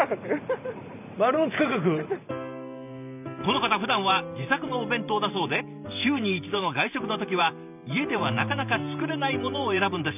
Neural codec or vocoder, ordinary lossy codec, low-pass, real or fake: vocoder, 44.1 kHz, 128 mel bands every 512 samples, BigVGAN v2; none; 3.6 kHz; fake